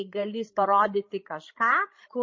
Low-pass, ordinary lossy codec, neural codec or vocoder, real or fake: 7.2 kHz; MP3, 32 kbps; codec, 16 kHz, 16 kbps, FreqCodec, larger model; fake